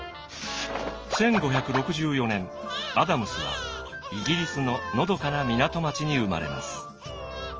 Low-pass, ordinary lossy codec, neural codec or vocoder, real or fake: 7.2 kHz; Opus, 24 kbps; none; real